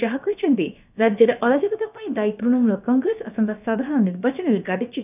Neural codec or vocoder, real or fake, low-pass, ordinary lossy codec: codec, 16 kHz, about 1 kbps, DyCAST, with the encoder's durations; fake; 3.6 kHz; none